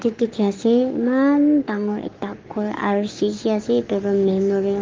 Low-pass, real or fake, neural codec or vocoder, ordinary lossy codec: 7.2 kHz; fake; codec, 44.1 kHz, 7.8 kbps, Pupu-Codec; Opus, 32 kbps